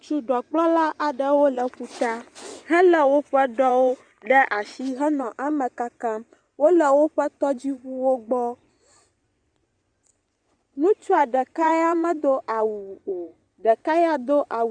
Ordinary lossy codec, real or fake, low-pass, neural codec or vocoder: Opus, 64 kbps; fake; 9.9 kHz; vocoder, 24 kHz, 100 mel bands, Vocos